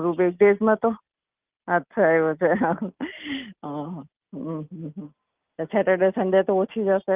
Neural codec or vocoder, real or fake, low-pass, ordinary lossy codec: none; real; 3.6 kHz; Opus, 24 kbps